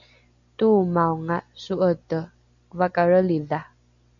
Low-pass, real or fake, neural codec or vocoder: 7.2 kHz; real; none